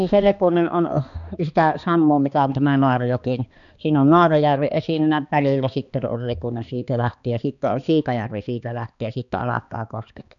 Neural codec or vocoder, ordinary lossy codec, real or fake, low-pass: codec, 16 kHz, 2 kbps, X-Codec, HuBERT features, trained on balanced general audio; none; fake; 7.2 kHz